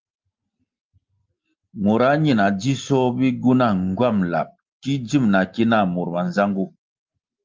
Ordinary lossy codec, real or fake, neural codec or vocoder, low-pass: Opus, 24 kbps; real; none; 7.2 kHz